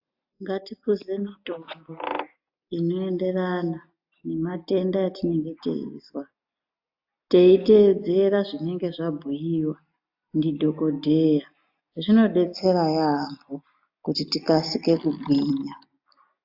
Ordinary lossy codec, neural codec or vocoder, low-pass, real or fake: AAC, 48 kbps; none; 5.4 kHz; real